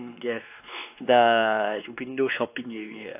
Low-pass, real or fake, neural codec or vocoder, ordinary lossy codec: 3.6 kHz; fake; codec, 16 kHz, 2 kbps, X-Codec, WavLM features, trained on Multilingual LibriSpeech; AAC, 32 kbps